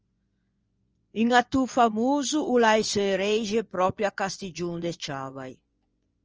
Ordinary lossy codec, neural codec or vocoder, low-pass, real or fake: Opus, 24 kbps; vocoder, 24 kHz, 100 mel bands, Vocos; 7.2 kHz; fake